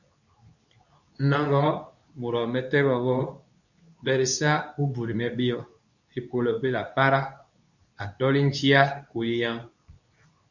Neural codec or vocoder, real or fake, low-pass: codec, 24 kHz, 0.9 kbps, WavTokenizer, medium speech release version 2; fake; 7.2 kHz